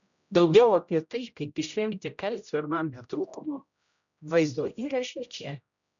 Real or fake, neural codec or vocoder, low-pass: fake; codec, 16 kHz, 0.5 kbps, X-Codec, HuBERT features, trained on general audio; 7.2 kHz